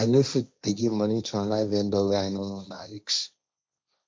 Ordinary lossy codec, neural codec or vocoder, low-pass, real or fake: none; codec, 16 kHz, 1.1 kbps, Voila-Tokenizer; none; fake